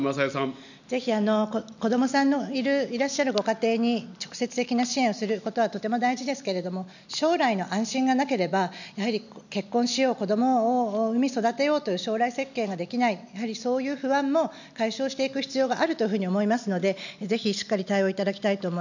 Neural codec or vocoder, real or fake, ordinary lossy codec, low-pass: none; real; none; 7.2 kHz